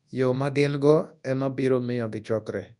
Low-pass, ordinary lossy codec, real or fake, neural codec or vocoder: 10.8 kHz; none; fake; codec, 24 kHz, 0.9 kbps, WavTokenizer, large speech release